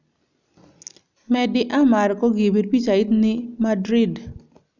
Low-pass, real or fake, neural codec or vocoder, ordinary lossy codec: 7.2 kHz; real; none; none